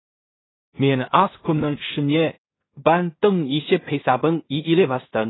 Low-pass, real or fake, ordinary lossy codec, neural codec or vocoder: 7.2 kHz; fake; AAC, 16 kbps; codec, 16 kHz in and 24 kHz out, 0.4 kbps, LongCat-Audio-Codec, two codebook decoder